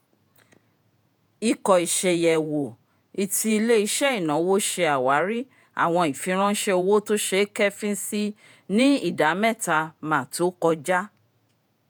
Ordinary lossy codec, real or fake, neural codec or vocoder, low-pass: none; fake; vocoder, 48 kHz, 128 mel bands, Vocos; none